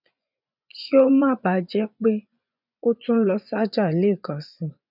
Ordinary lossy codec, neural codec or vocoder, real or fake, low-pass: none; vocoder, 24 kHz, 100 mel bands, Vocos; fake; 5.4 kHz